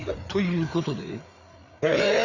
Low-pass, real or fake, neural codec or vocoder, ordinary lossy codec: 7.2 kHz; fake; codec, 16 kHz, 4 kbps, FreqCodec, larger model; none